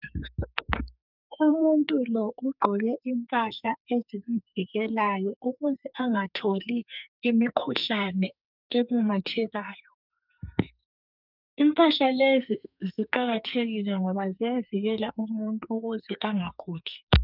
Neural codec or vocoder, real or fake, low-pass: codec, 44.1 kHz, 2.6 kbps, SNAC; fake; 5.4 kHz